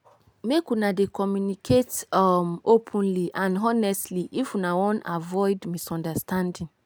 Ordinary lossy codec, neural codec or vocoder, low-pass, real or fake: none; none; none; real